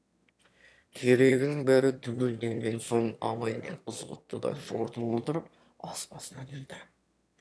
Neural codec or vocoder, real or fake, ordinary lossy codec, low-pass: autoencoder, 22.05 kHz, a latent of 192 numbers a frame, VITS, trained on one speaker; fake; none; none